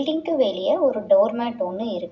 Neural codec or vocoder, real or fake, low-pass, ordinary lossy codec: none; real; none; none